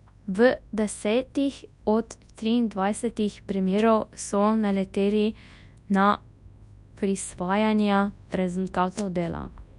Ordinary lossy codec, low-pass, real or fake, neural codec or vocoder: none; 10.8 kHz; fake; codec, 24 kHz, 0.9 kbps, WavTokenizer, large speech release